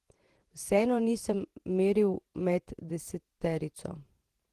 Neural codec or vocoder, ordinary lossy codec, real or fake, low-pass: vocoder, 48 kHz, 128 mel bands, Vocos; Opus, 16 kbps; fake; 14.4 kHz